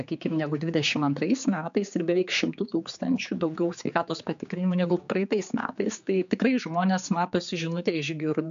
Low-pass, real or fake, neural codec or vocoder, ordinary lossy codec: 7.2 kHz; fake; codec, 16 kHz, 4 kbps, X-Codec, HuBERT features, trained on general audio; MP3, 64 kbps